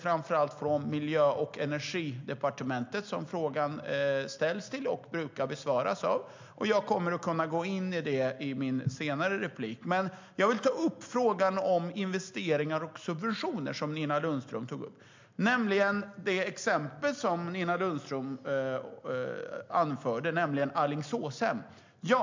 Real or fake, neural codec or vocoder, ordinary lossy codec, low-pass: real; none; MP3, 64 kbps; 7.2 kHz